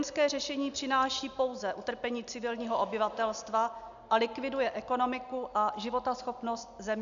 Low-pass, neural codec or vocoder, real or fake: 7.2 kHz; none; real